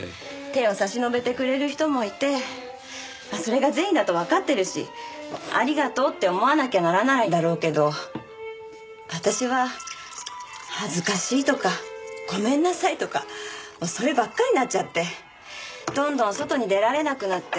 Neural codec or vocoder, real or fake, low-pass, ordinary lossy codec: none; real; none; none